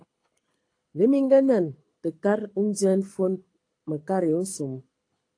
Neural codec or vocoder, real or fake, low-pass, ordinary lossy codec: codec, 24 kHz, 6 kbps, HILCodec; fake; 9.9 kHz; AAC, 48 kbps